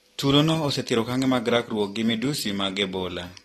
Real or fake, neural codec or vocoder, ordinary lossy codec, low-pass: real; none; AAC, 32 kbps; 19.8 kHz